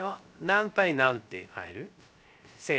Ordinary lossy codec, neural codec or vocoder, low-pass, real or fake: none; codec, 16 kHz, 0.3 kbps, FocalCodec; none; fake